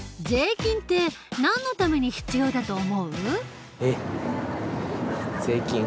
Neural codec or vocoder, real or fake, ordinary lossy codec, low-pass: none; real; none; none